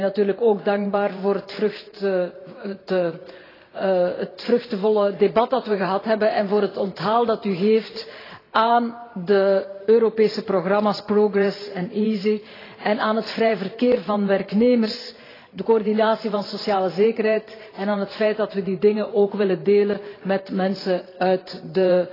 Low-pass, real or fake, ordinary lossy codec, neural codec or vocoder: 5.4 kHz; fake; AAC, 24 kbps; vocoder, 44.1 kHz, 128 mel bands every 512 samples, BigVGAN v2